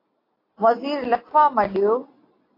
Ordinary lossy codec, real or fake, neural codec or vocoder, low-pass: AAC, 24 kbps; real; none; 5.4 kHz